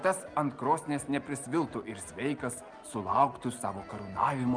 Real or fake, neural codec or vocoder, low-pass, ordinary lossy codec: real; none; 9.9 kHz; Opus, 32 kbps